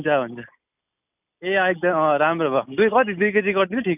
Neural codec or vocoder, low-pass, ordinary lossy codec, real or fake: none; 3.6 kHz; none; real